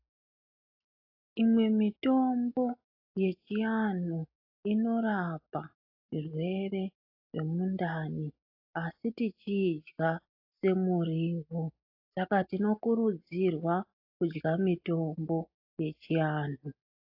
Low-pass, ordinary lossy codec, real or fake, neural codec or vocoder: 5.4 kHz; AAC, 32 kbps; real; none